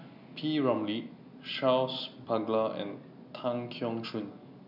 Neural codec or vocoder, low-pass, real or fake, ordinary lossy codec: none; 5.4 kHz; real; none